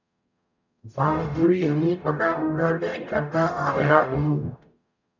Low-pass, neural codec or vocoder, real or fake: 7.2 kHz; codec, 44.1 kHz, 0.9 kbps, DAC; fake